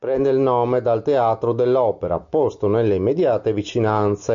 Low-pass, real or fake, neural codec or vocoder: 7.2 kHz; real; none